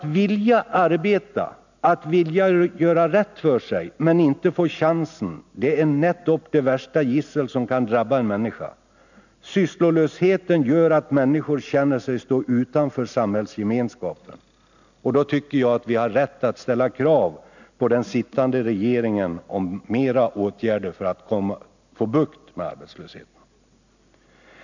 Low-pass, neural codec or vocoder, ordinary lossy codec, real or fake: 7.2 kHz; none; none; real